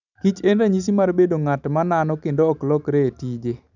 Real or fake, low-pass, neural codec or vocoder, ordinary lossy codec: real; 7.2 kHz; none; none